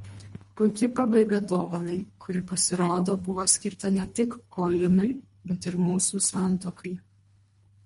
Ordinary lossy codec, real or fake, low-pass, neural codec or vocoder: MP3, 48 kbps; fake; 10.8 kHz; codec, 24 kHz, 1.5 kbps, HILCodec